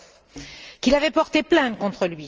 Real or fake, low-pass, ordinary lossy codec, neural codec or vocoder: real; 7.2 kHz; Opus, 24 kbps; none